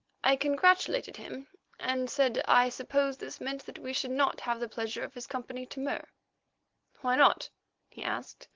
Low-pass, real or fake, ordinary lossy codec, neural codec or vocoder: 7.2 kHz; real; Opus, 16 kbps; none